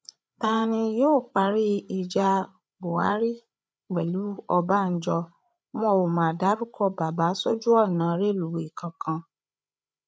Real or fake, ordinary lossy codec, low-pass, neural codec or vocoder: fake; none; none; codec, 16 kHz, 8 kbps, FreqCodec, larger model